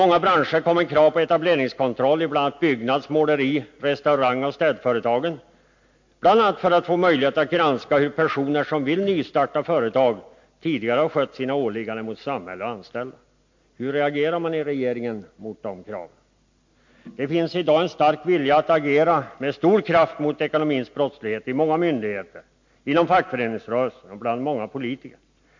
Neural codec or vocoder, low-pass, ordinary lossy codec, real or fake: none; 7.2 kHz; MP3, 48 kbps; real